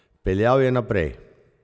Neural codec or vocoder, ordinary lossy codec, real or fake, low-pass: none; none; real; none